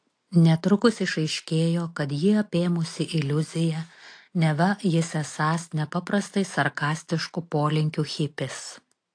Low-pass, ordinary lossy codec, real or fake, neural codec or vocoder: 9.9 kHz; AAC, 48 kbps; real; none